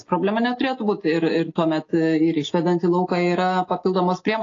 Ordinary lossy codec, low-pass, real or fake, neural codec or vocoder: AAC, 32 kbps; 7.2 kHz; real; none